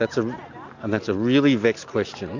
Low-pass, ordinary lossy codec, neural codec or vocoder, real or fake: 7.2 kHz; AAC, 48 kbps; none; real